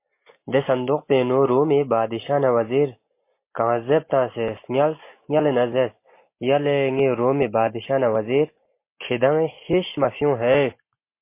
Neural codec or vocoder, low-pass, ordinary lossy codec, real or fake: none; 3.6 kHz; MP3, 24 kbps; real